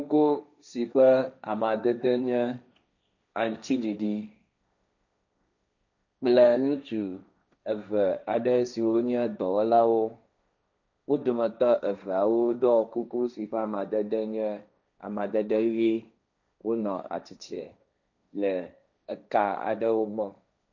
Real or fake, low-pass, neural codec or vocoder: fake; 7.2 kHz; codec, 16 kHz, 1.1 kbps, Voila-Tokenizer